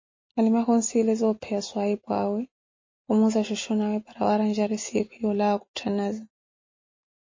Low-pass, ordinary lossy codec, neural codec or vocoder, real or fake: 7.2 kHz; MP3, 32 kbps; none; real